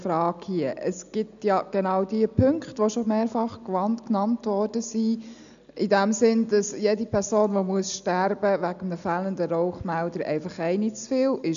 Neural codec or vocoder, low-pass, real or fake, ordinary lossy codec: none; 7.2 kHz; real; none